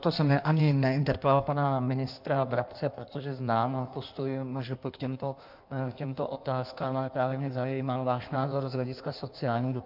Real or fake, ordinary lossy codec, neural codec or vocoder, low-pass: fake; MP3, 48 kbps; codec, 16 kHz in and 24 kHz out, 1.1 kbps, FireRedTTS-2 codec; 5.4 kHz